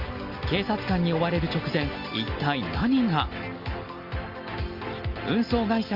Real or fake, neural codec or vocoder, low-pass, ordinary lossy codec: real; none; 5.4 kHz; Opus, 32 kbps